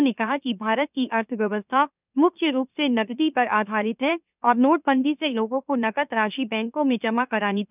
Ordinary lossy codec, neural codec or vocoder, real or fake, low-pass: none; autoencoder, 44.1 kHz, a latent of 192 numbers a frame, MeloTTS; fake; 3.6 kHz